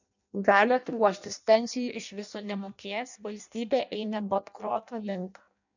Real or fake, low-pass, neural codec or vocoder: fake; 7.2 kHz; codec, 16 kHz in and 24 kHz out, 0.6 kbps, FireRedTTS-2 codec